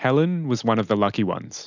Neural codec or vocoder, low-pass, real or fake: none; 7.2 kHz; real